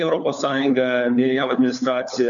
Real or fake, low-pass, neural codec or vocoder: fake; 7.2 kHz; codec, 16 kHz, 4 kbps, FunCodec, trained on LibriTTS, 50 frames a second